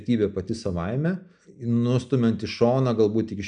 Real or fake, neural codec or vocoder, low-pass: real; none; 9.9 kHz